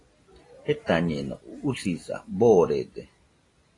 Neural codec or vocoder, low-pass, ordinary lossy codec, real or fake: none; 10.8 kHz; AAC, 32 kbps; real